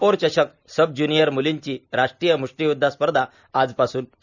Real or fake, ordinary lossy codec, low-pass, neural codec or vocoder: real; none; 7.2 kHz; none